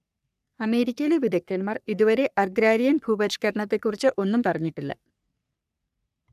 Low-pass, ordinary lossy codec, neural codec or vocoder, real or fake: 14.4 kHz; none; codec, 44.1 kHz, 3.4 kbps, Pupu-Codec; fake